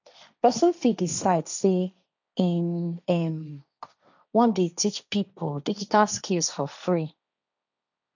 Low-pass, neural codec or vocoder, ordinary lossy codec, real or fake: 7.2 kHz; codec, 16 kHz, 1.1 kbps, Voila-Tokenizer; none; fake